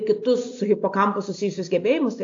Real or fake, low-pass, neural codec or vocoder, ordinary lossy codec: real; 7.2 kHz; none; AAC, 48 kbps